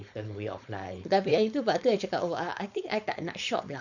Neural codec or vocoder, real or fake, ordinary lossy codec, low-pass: codec, 16 kHz, 4.8 kbps, FACodec; fake; none; 7.2 kHz